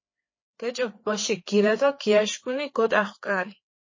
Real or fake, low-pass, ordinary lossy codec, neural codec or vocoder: fake; 7.2 kHz; MP3, 32 kbps; codec, 16 kHz, 4 kbps, FreqCodec, larger model